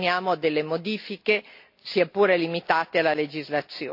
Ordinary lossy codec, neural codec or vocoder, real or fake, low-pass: AAC, 48 kbps; none; real; 5.4 kHz